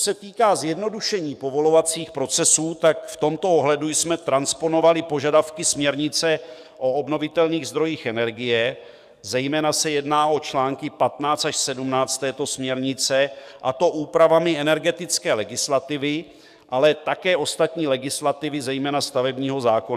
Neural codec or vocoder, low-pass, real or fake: codec, 44.1 kHz, 7.8 kbps, DAC; 14.4 kHz; fake